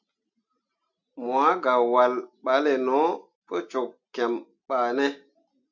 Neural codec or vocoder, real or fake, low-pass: none; real; 7.2 kHz